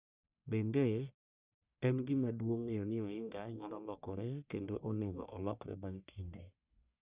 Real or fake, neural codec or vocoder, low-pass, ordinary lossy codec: fake; codec, 44.1 kHz, 1.7 kbps, Pupu-Codec; 3.6 kHz; Opus, 64 kbps